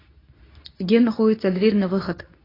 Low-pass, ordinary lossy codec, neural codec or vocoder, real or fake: 5.4 kHz; AAC, 24 kbps; codec, 24 kHz, 0.9 kbps, WavTokenizer, medium speech release version 2; fake